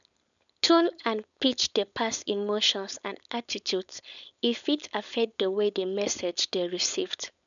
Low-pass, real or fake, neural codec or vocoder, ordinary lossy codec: 7.2 kHz; fake; codec, 16 kHz, 4.8 kbps, FACodec; none